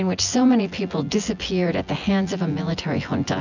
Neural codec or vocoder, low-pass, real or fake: vocoder, 24 kHz, 100 mel bands, Vocos; 7.2 kHz; fake